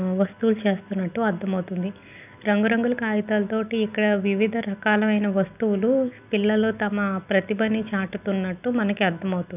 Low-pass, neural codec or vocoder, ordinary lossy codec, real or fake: 3.6 kHz; none; none; real